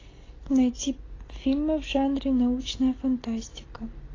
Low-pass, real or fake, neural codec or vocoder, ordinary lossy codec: 7.2 kHz; real; none; AAC, 32 kbps